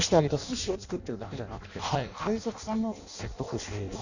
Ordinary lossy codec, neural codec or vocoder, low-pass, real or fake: none; codec, 16 kHz in and 24 kHz out, 0.6 kbps, FireRedTTS-2 codec; 7.2 kHz; fake